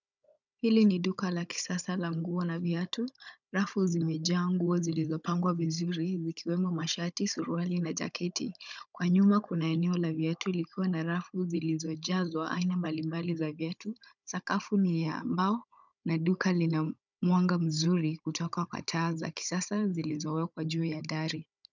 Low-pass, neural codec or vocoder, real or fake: 7.2 kHz; codec, 16 kHz, 16 kbps, FunCodec, trained on Chinese and English, 50 frames a second; fake